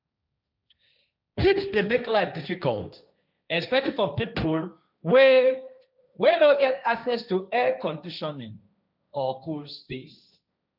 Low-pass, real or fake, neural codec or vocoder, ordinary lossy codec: 5.4 kHz; fake; codec, 16 kHz, 1.1 kbps, Voila-Tokenizer; none